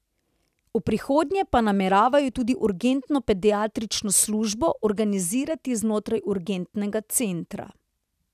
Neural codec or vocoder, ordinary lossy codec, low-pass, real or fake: none; none; 14.4 kHz; real